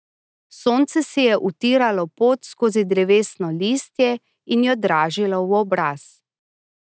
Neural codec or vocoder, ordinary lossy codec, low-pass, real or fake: none; none; none; real